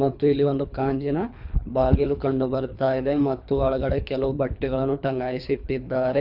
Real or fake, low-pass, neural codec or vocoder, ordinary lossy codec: fake; 5.4 kHz; codec, 24 kHz, 3 kbps, HILCodec; none